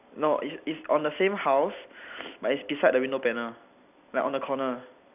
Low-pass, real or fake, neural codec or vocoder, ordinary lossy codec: 3.6 kHz; fake; vocoder, 44.1 kHz, 128 mel bands every 256 samples, BigVGAN v2; none